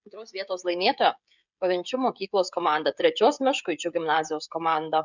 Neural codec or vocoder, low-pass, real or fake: codec, 16 kHz, 16 kbps, FreqCodec, smaller model; 7.2 kHz; fake